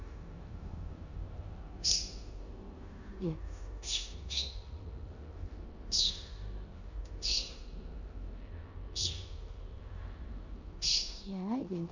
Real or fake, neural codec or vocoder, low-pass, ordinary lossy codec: fake; codec, 16 kHz in and 24 kHz out, 0.9 kbps, LongCat-Audio-Codec, four codebook decoder; 7.2 kHz; none